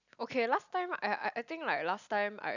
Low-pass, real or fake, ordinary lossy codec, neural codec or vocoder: 7.2 kHz; real; none; none